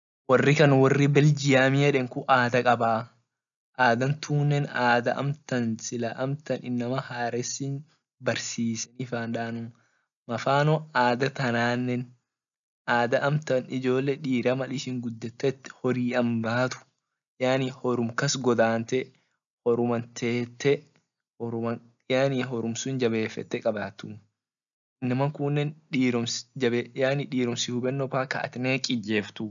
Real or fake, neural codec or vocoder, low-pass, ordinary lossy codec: real; none; 7.2 kHz; none